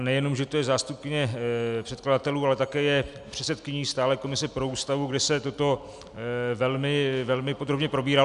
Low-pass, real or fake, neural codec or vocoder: 10.8 kHz; real; none